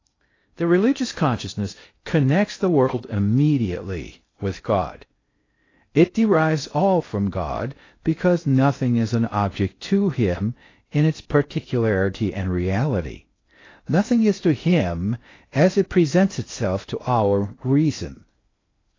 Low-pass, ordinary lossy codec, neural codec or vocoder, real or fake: 7.2 kHz; AAC, 32 kbps; codec, 16 kHz in and 24 kHz out, 0.6 kbps, FocalCodec, streaming, 2048 codes; fake